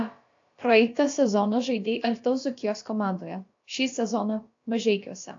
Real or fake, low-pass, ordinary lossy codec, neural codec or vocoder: fake; 7.2 kHz; AAC, 48 kbps; codec, 16 kHz, about 1 kbps, DyCAST, with the encoder's durations